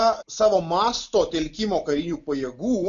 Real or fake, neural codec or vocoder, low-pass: real; none; 7.2 kHz